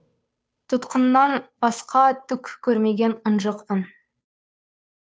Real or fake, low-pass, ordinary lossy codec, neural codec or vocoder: fake; none; none; codec, 16 kHz, 2 kbps, FunCodec, trained on Chinese and English, 25 frames a second